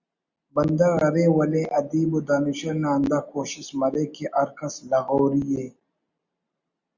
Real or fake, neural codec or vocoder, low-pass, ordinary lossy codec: real; none; 7.2 kHz; Opus, 64 kbps